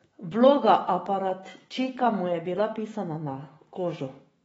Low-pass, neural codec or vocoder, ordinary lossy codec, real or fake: 19.8 kHz; autoencoder, 48 kHz, 128 numbers a frame, DAC-VAE, trained on Japanese speech; AAC, 24 kbps; fake